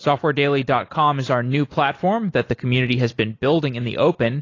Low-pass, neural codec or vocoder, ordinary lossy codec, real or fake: 7.2 kHz; none; AAC, 32 kbps; real